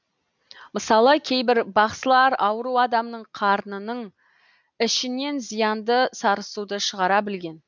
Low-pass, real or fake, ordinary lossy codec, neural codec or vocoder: 7.2 kHz; real; none; none